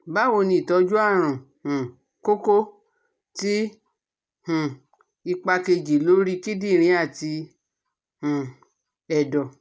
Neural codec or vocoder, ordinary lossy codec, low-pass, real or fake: none; none; none; real